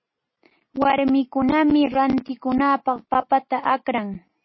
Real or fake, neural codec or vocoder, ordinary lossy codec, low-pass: real; none; MP3, 24 kbps; 7.2 kHz